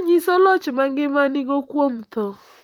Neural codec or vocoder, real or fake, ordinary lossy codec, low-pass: vocoder, 44.1 kHz, 128 mel bands, Pupu-Vocoder; fake; none; 19.8 kHz